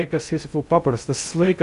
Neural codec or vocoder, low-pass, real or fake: codec, 16 kHz in and 24 kHz out, 0.6 kbps, FocalCodec, streaming, 2048 codes; 10.8 kHz; fake